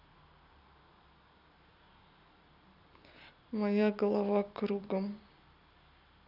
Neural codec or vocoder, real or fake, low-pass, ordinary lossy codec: vocoder, 44.1 kHz, 128 mel bands every 256 samples, BigVGAN v2; fake; 5.4 kHz; none